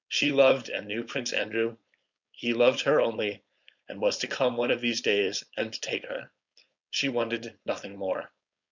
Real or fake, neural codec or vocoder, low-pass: fake; codec, 16 kHz, 4.8 kbps, FACodec; 7.2 kHz